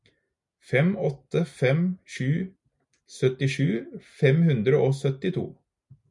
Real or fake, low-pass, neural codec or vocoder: real; 10.8 kHz; none